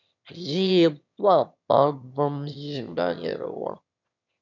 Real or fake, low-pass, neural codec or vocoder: fake; 7.2 kHz; autoencoder, 22.05 kHz, a latent of 192 numbers a frame, VITS, trained on one speaker